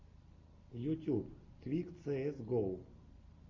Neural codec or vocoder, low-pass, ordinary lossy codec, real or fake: none; 7.2 kHz; MP3, 48 kbps; real